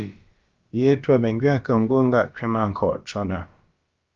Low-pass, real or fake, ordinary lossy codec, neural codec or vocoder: 7.2 kHz; fake; Opus, 32 kbps; codec, 16 kHz, about 1 kbps, DyCAST, with the encoder's durations